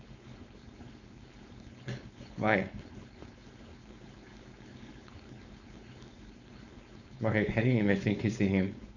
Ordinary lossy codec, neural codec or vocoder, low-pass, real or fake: none; codec, 16 kHz, 4.8 kbps, FACodec; 7.2 kHz; fake